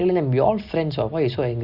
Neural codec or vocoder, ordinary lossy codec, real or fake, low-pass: none; Opus, 64 kbps; real; 5.4 kHz